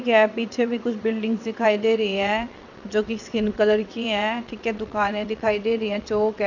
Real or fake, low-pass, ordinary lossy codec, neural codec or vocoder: fake; 7.2 kHz; none; vocoder, 22.05 kHz, 80 mel bands, WaveNeXt